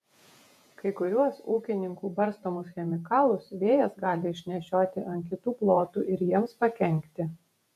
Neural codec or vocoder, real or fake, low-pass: vocoder, 48 kHz, 128 mel bands, Vocos; fake; 14.4 kHz